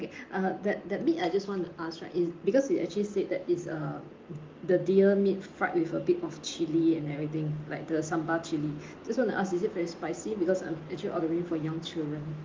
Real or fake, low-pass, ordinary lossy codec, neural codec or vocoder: real; 7.2 kHz; Opus, 32 kbps; none